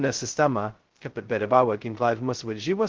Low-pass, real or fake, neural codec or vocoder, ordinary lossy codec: 7.2 kHz; fake; codec, 16 kHz, 0.2 kbps, FocalCodec; Opus, 16 kbps